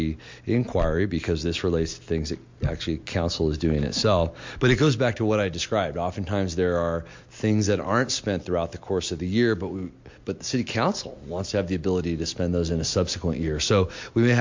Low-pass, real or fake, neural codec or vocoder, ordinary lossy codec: 7.2 kHz; real; none; MP3, 48 kbps